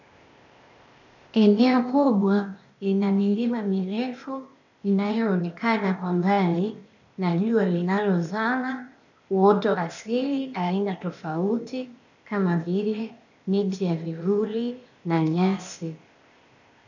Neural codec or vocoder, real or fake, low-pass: codec, 16 kHz, 0.8 kbps, ZipCodec; fake; 7.2 kHz